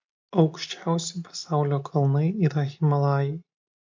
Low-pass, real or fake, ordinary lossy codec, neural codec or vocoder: 7.2 kHz; real; MP3, 48 kbps; none